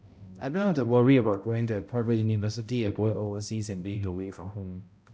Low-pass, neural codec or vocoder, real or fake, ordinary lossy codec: none; codec, 16 kHz, 0.5 kbps, X-Codec, HuBERT features, trained on balanced general audio; fake; none